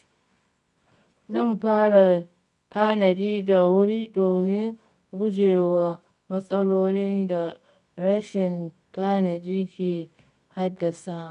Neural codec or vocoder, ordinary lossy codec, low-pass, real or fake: codec, 24 kHz, 0.9 kbps, WavTokenizer, medium music audio release; none; 10.8 kHz; fake